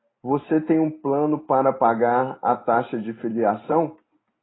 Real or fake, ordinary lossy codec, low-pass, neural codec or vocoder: real; AAC, 16 kbps; 7.2 kHz; none